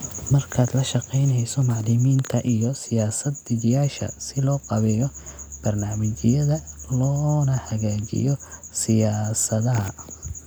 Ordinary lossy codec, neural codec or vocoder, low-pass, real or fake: none; none; none; real